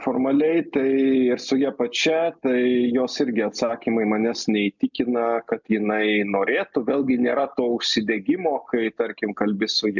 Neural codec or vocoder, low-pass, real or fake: none; 7.2 kHz; real